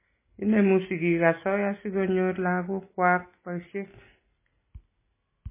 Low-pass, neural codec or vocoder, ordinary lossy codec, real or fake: 3.6 kHz; none; MP3, 16 kbps; real